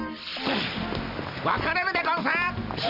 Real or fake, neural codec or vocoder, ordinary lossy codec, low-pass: real; none; MP3, 48 kbps; 5.4 kHz